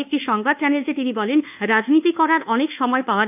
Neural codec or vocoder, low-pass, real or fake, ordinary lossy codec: codec, 24 kHz, 1.2 kbps, DualCodec; 3.6 kHz; fake; AAC, 32 kbps